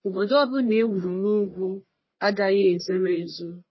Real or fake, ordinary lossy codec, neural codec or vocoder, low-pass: fake; MP3, 24 kbps; codec, 44.1 kHz, 1.7 kbps, Pupu-Codec; 7.2 kHz